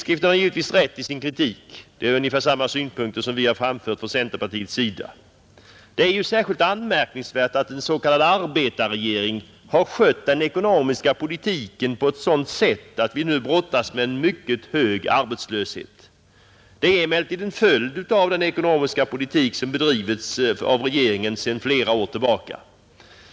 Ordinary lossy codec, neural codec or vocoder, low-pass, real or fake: none; none; none; real